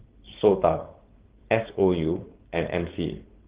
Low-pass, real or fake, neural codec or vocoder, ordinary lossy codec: 3.6 kHz; fake; codec, 16 kHz, 4.8 kbps, FACodec; Opus, 16 kbps